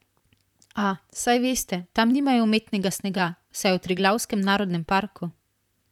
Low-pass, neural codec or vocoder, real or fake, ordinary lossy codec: 19.8 kHz; vocoder, 44.1 kHz, 128 mel bands, Pupu-Vocoder; fake; none